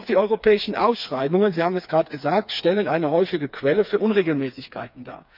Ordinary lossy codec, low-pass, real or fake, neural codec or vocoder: none; 5.4 kHz; fake; codec, 16 kHz, 4 kbps, FreqCodec, smaller model